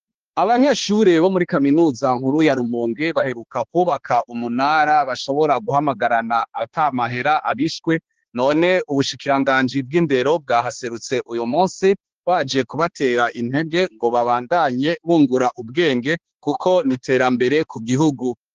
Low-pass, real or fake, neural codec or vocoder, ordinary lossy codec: 7.2 kHz; fake; codec, 16 kHz, 2 kbps, X-Codec, HuBERT features, trained on balanced general audio; Opus, 16 kbps